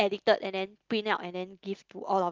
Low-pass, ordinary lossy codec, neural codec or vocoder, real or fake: 7.2 kHz; Opus, 32 kbps; none; real